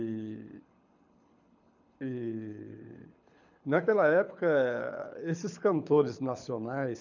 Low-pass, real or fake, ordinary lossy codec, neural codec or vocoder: 7.2 kHz; fake; none; codec, 24 kHz, 6 kbps, HILCodec